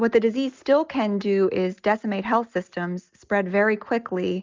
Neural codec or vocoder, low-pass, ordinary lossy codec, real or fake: none; 7.2 kHz; Opus, 32 kbps; real